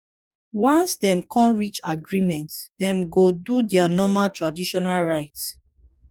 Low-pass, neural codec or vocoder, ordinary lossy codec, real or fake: 19.8 kHz; codec, 44.1 kHz, 2.6 kbps, DAC; none; fake